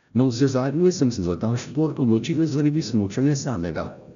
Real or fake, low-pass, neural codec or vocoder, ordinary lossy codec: fake; 7.2 kHz; codec, 16 kHz, 0.5 kbps, FreqCodec, larger model; none